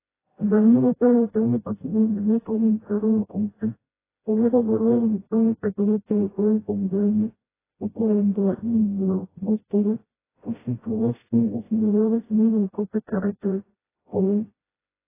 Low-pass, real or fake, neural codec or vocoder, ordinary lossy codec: 3.6 kHz; fake; codec, 16 kHz, 0.5 kbps, FreqCodec, smaller model; AAC, 16 kbps